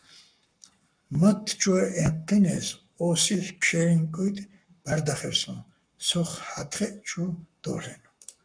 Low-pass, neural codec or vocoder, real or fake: 9.9 kHz; codec, 44.1 kHz, 7.8 kbps, Pupu-Codec; fake